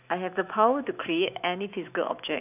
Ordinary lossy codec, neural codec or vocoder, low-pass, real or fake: none; autoencoder, 48 kHz, 128 numbers a frame, DAC-VAE, trained on Japanese speech; 3.6 kHz; fake